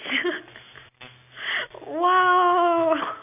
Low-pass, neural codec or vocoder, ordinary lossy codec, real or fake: 3.6 kHz; none; none; real